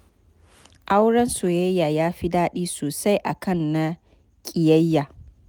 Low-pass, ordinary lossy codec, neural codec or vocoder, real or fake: none; none; none; real